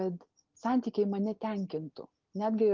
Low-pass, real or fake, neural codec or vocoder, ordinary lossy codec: 7.2 kHz; real; none; Opus, 32 kbps